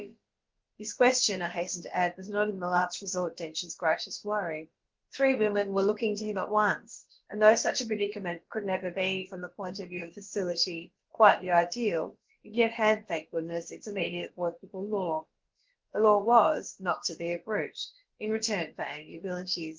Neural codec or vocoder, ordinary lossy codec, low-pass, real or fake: codec, 16 kHz, about 1 kbps, DyCAST, with the encoder's durations; Opus, 16 kbps; 7.2 kHz; fake